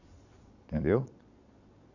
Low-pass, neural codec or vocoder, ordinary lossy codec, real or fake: 7.2 kHz; vocoder, 22.05 kHz, 80 mel bands, Vocos; none; fake